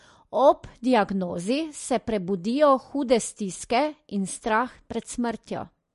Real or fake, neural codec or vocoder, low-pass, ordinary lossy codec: real; none; 14.4 kHz; MP3, 48 kbps